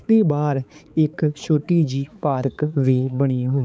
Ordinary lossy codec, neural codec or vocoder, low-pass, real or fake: none; codec, 16 kHz, 4 kbps, X-Codec, HuBERT features, trained on balanced general audio; none; fake